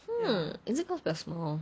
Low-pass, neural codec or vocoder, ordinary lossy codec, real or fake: none; none; none; real